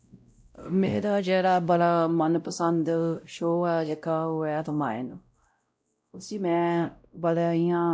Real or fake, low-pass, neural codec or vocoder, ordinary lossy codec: fake; none; codec, 16 kHz, 0.5 kbps, X-Codec, WavLM features, trained on Multilingual LibriSpeech; none